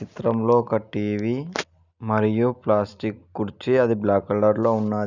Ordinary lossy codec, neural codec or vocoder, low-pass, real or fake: none; none; 7.2 kHz; real